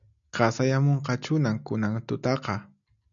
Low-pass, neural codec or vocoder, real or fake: 7.2 kHz; none; real